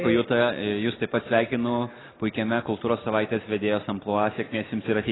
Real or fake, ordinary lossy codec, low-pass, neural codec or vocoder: real; AAC, 16 kbps; 7.2 kHz; none